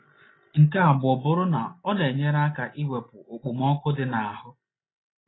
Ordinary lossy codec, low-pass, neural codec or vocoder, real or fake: AAC, 16 kbps; 7.2 kHz; none; real